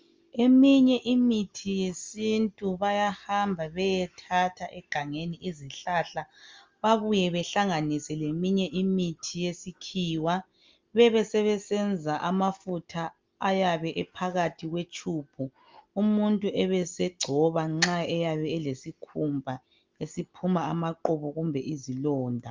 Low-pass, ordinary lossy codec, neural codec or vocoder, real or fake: 7.2 kHz; Opus, 32 kbps; none; real